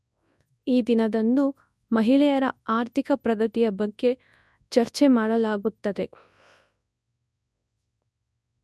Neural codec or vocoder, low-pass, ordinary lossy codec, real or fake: codec, 24 kHz, 0.9 kbps, WavTokenizer, large speech release; none; none; fake